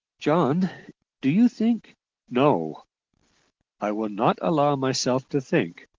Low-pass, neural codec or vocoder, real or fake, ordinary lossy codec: 7.2 kHz; none; real; Opus, 16 kbps